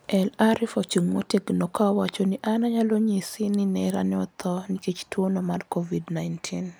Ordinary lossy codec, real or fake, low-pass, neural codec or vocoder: none; real; none; none